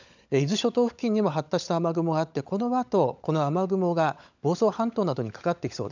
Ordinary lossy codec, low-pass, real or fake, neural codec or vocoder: none; 7.2 kHz; fake; codec, 16 kHz, 16 kbps, FunCodec, trained on LibriTTS, 50 frames a second